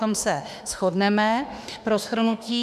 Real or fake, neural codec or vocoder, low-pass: fake; autoencoder, 48 kHz, 32 numbers a frame, DAC-VAE, trained on Japanese speech; 14.4 kHz